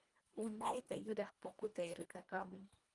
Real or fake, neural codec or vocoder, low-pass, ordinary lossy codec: fake; codec, 24 kHz, 1.5 kbps, HILCodec; 10.8 kHz; Opus, 32 kbps